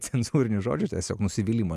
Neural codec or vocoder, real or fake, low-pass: none; real; 14.4 kHz